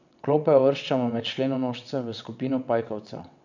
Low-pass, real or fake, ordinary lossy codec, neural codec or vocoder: 7.2 kHz; fake; none; vocoder, 22.05 kHz, 80 mel bands, WaveNeXt